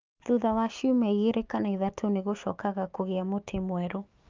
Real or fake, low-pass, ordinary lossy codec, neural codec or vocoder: fake; 7.2 kHz; Opus, 32 kbps; autoencoder, 48 kHz, 128 numbers a frame, DAC-VAE, trained on Japanese speech